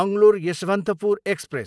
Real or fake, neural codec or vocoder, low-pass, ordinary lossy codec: real; none; none; none